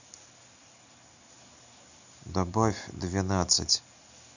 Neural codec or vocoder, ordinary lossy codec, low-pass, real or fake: none; none; 7.2 kHz; real